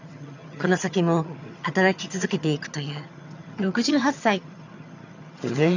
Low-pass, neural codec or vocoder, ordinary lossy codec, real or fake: 7.2 kHz; vocoder, 22.05 kHz, 80 mel bands, HiFi-GAN; none; fake